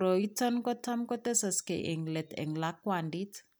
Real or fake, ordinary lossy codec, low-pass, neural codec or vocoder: real; none; none; none